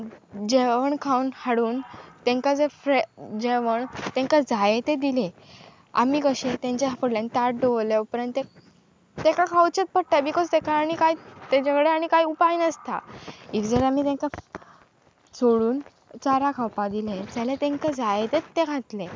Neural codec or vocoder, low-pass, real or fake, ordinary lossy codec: none; none; real; none